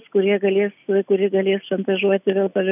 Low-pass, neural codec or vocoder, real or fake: 3.6 kHz; none; real